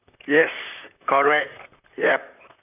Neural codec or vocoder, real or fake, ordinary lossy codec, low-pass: vocoder, 44.1 kHz, 128 mel bands, Pupu-Vocoder; fake; none; 3.6 kHz